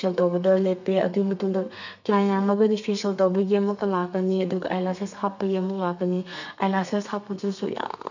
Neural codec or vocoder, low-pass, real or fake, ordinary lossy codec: codec, 32 kHz, 1.9 kbps, SNAC; 7.2 kHz; fake; none